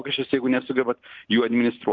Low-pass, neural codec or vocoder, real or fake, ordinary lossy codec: 7.2 kHz; none; real; Opus, 32 kbps